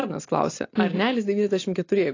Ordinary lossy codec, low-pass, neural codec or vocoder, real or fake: AAC, 32 kbps; 7.2 kHz; none; real